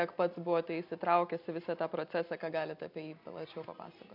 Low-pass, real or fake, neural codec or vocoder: 5.4 kHz; real; none